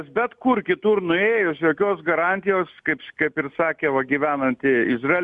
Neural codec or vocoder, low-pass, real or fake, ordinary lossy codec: none; 10.8 kHz; real; Opus, 64 kbps